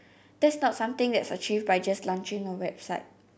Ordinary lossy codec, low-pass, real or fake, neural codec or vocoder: none; none; real; none